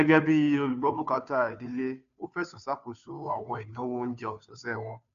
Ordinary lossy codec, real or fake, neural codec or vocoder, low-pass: none; fake; codec, 16 kHz, 2 kbps, FunCodec, trained on Chinese and English, 25 frames a second; 7.2 kHz